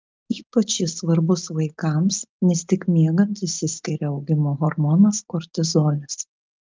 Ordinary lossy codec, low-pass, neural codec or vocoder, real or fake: Opus, 24 kbps; 7.2 kHz; none; real